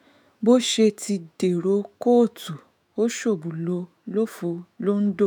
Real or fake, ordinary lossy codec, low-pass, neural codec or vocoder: fake; none; none; autoencoder, 48 kHz, 128 numbers a frame, DAC-VAE, trained on Japanese speech